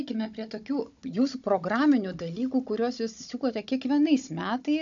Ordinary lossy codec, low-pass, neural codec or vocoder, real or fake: AAC, 64 kbps; 7.2 kHz; codec, 16 kHz, 16 kbps, FunCodec, trained on Chinese and English, 50 frames a second; fake